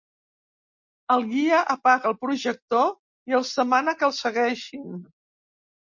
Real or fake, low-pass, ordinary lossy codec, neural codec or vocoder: real; 7.2 kHz; MP3, 48 kbps; none